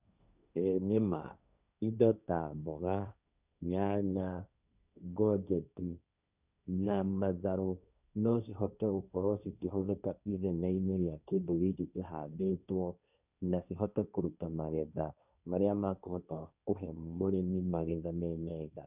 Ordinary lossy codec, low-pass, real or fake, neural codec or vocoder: none; 3.6 kHz; fake; codec, 16 kHz, 1.1 kbps, Voila-Tokenizer